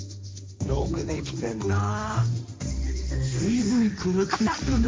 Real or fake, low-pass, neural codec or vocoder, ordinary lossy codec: fake; none; codec, 16 kHz, 1.1 kbps, Voila-Tokenizer; none